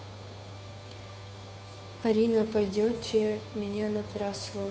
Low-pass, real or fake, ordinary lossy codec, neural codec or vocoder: none; fake; none; codec, 16 kHz, 2 kbps, FunCodec, trained on Chinese and English, 25 frames a second